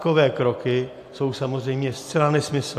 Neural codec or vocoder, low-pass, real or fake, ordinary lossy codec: none; 14.4 kHz; real; AAC, 64 kbps